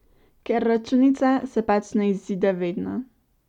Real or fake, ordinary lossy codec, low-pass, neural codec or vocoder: real; none; 19.8 kHz; none